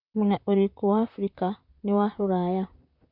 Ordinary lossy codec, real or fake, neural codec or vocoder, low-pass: none; fake; vocoder, 44.1 kHz, 128 mel bands, Pupu-Vocoder; 5.4 kHz